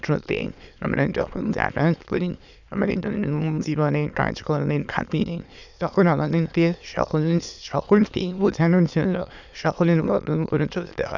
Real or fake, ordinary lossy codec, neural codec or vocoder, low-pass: fake; none; autoencoder, 22.05 kHz, a latent of 192 numbers a frame, VITS, trained on many speakers; 7.2 kHz